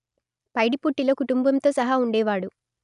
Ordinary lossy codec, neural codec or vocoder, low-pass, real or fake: none; none; 10.8 kHz; real